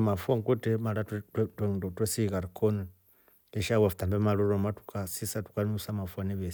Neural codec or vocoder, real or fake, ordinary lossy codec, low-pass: none; real; none; none